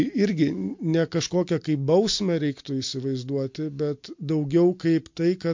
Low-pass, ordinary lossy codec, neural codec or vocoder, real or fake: 7.2 kHz; MP3, 48 kbps; none; real